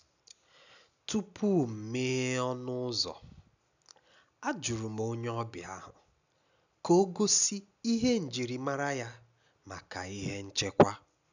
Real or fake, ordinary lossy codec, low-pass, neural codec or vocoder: real; none; 7.2 kHz; none